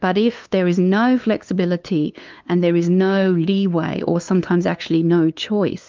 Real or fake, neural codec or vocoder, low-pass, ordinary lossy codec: fake; codec, 16 kHz, 2 kbps, FunCodec, trained on LibriTTS, 25 frames a second; 7.2 kHz; Opus, 32 kbps